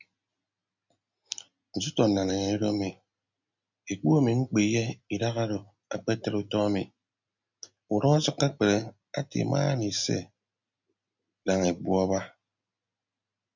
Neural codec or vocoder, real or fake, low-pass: none; real; 7.2 kHz